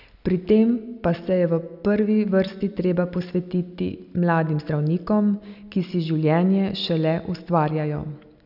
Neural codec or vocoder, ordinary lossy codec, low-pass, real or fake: none; none; 5.4 kHz; real